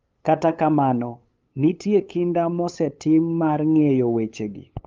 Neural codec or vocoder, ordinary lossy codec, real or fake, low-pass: codec, 16 kHz, 8 kbps, FunCodec, trained on LibriTTS, 25 frames a second; Opus, 32 kbps; fake; 7.2 kHz